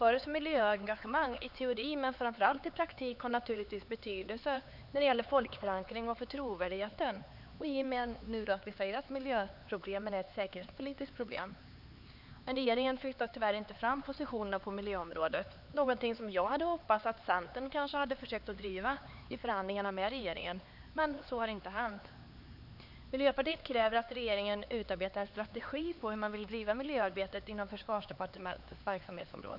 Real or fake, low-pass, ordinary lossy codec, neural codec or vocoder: fake; 5.4 kHz; none; codec, 16 kHz, 4 kbps, X-Codec, HuBERT features, trained on LibriSpeech